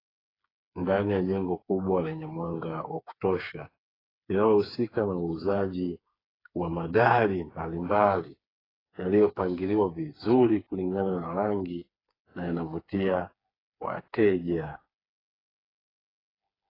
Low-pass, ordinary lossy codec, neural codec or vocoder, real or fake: 5.4 kHz; AAC, 24 kbps; codec, 16 kHz, 4 kbps, FreqCodec, smaller model; fake